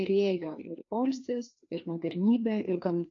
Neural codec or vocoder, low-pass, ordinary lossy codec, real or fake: codec, 16 kHz, 2 kbps, FreqCodec, larger model; 7.2 kHz; AAC, 48 kbps; fake